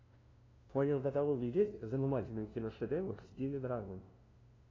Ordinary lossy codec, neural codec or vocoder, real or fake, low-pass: AAC, 32 kbps; codec, 16 kHz, 0.5 kbps, FunCodec, trained on LibriTTS, 25 frames a second; fake; 7.2 kHz